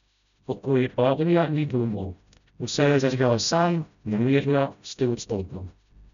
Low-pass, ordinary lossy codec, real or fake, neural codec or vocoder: 7.2 kHz; none; fake; codec, 16 kHz, 0.5 kbps, FreqCodec, smaller model